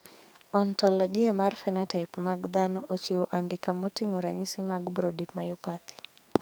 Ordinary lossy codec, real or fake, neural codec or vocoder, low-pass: none; fake; codec, 44.1 kHz, 2.6 kbps, SNAC; none